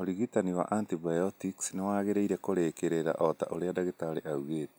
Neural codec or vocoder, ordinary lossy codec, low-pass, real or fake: none; none; none; real